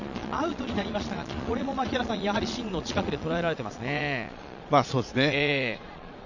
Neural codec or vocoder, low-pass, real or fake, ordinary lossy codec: vocoder, 22.05 kHz, 80 mel bands, Vocos; 7.2 kHz; fake; none